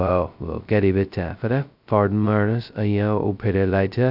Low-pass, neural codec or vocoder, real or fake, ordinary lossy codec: 5.4 kHz; codec, 16 kHz, 0.2 kbps, FocalCodec; fake; none